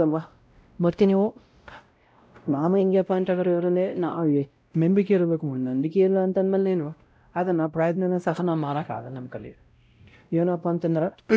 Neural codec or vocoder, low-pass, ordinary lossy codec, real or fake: codec, 16 kHz, 0.5 kbps, X-Codec, WavLM features, trained on Multilingual LibriSpeech; none; none; fake